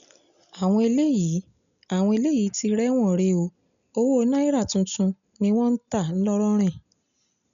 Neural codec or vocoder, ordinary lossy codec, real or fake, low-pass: none; none; real; 7.2 kHz